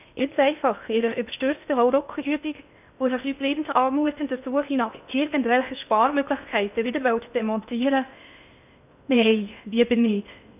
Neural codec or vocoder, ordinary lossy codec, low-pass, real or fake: codec, 16 kHz in and 24 kHz out, 0.6 kbps, FocalCodec, streaming, 2048 codes; none; 3.6 kHz; fake